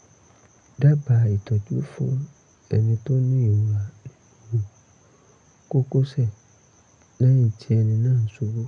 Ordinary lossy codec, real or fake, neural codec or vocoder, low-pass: none; real; none; 9.9 kHz